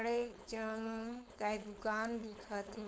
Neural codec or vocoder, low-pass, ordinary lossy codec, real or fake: codec, 16 kHz, 4.8 kbps, FACodec; none; none; fake